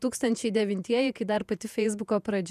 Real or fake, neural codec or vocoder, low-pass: fake; vocoder, 48 kHz, 128 mel bands, Vocos; 14.4 kHz